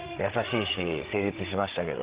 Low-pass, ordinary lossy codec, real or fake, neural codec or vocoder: 3.6 kHz; Opus, 16 kbps; fake; codec, 16 kHz, 16 kbps, FreqCodec, larger model